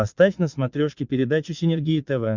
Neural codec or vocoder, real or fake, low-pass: vocoder, 22.05 kHz, 80 mel bands, Vocos; fake; 7.2 kHz